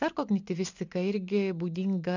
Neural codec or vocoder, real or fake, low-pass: none; real; 7.2 kHz